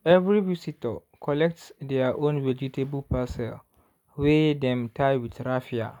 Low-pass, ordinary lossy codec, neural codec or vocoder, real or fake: 19.8 kHz; none; none; real